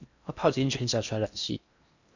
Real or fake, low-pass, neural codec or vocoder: fake; 7.2 kHz; codec, 16 kHz in and 24 kHz out, 0.6 kbps, FocalCodec, streaming, 4096 codes